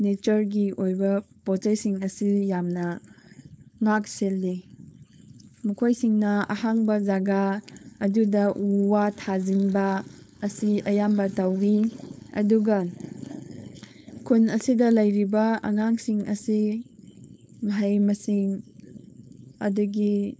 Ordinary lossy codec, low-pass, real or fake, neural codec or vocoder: none; none; fake; codec, 16 kHz, 4.8 kbps, FACodec